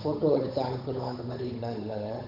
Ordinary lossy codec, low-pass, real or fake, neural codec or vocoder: none; 5.4 kHz; fake; codec, 16 kHz, 8 kbps, FunCodec, trained on Chinese and English, 25 frames a second